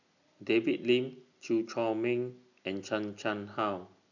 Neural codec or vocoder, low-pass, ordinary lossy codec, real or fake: none; 7.2 kHz; none; real